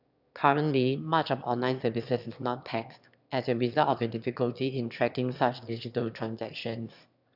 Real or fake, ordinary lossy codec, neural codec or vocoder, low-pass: fake; none; autoencoder, 22.05 kHz, a latent of 192 numbers a frame, VITS, trained on one speaker; 5.4 kHz